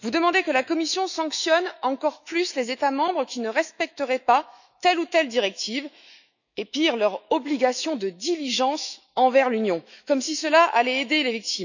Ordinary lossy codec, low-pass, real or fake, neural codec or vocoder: none; 7.2 kHz; fake; autoencoder, 48 kHz, 128 numbers a frame, DAC-VAE, trained on Japanese speech